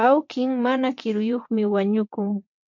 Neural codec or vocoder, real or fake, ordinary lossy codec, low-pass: vocoder, 22.05 kHz, 80 mel bands, WaveNeXt; fake; MP3, 48 kbps; 7.2 kHz